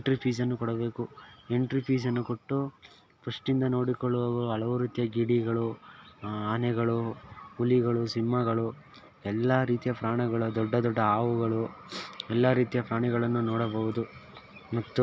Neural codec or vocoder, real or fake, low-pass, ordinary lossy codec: none; real; none; none